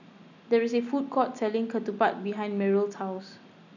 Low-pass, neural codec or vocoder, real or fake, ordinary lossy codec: 7.2 kHz; none; real; none